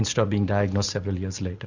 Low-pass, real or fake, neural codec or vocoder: 7.2 kHz; real; none